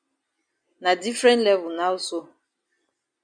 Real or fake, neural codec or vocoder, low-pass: real; none; 9.9 kHz